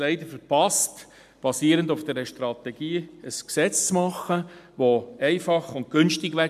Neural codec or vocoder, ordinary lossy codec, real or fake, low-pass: none; MP3, 96 kbps; real; 14.4 kHz